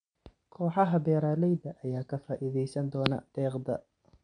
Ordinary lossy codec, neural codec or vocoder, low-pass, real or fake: MP3, 64 kbps; none; 9.9 kHz; real